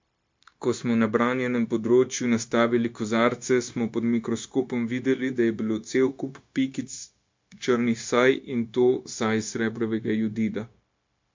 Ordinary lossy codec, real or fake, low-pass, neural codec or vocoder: MP3, 48 kbps; fake; 7.2 kHz; codec, 16 kHz, 0.9 kbps, LongCat-Audio-Codec